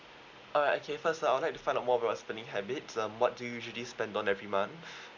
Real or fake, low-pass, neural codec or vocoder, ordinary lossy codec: real; 7.2 kHz; none; Opus, 64 kbps